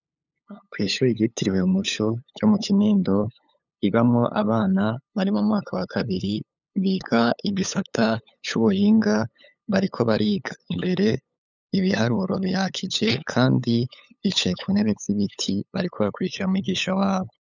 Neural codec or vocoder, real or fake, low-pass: codec, 16 kHz, 8 kbps, FunCodec, trained on LibriTTS, 25 frames a second; fake; 7.2 kHz